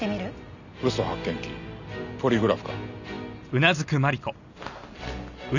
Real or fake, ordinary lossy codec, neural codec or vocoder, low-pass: real; none; none; 7.2 kHz